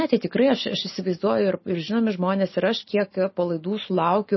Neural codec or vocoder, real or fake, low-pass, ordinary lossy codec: none; real; 7.2 kHz; MP3, 24 kbps